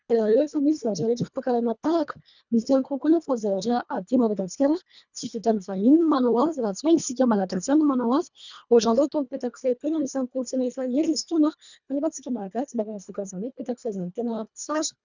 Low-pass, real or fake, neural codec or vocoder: 7.2 kHz; fake; codec, 24 kHz, 1.5 kbps, HILCodec